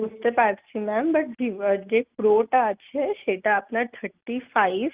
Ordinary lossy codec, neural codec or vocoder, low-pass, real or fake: Opus, 32 kbps; none; 3.6 kHz; real